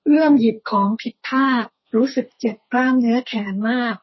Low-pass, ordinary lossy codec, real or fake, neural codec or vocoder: 7.2 kHz; MP3, 24 kbps; fake; codec, 32 kHz, 1.9 kbps, SNAC